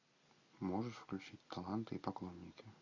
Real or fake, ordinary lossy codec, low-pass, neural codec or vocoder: real; AAC, 48 kbps; 7.2 kHz; none